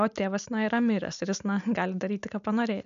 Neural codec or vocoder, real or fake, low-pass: none; real; 7.2 kHz